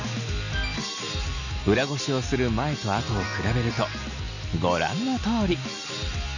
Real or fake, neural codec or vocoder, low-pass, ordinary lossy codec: real; none; 7.2 kHz; none